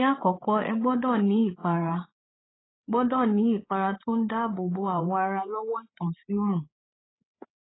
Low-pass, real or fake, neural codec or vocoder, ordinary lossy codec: 7.2 kHz; fake; codec, 16 kHz, 8 kbps, FreqCodec, larger model; AAC, 16 kbps